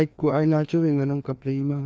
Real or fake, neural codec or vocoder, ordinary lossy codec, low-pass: fake; codec, 16 kHz, 2 kbps, FreqCodec, larger model; none; none